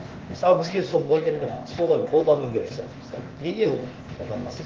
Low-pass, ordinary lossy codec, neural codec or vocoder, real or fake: 7.2 kHz; Opus, 16 kbps; codec, 16 kHz, 0.8 kbps, ZipCodec; fake